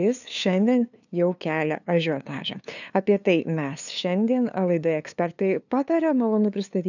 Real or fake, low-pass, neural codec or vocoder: fake; 7.2 kHz; codec, 16 kHz, 2 kbps, FunCodec, trained on LibriTTS, 25 frames a second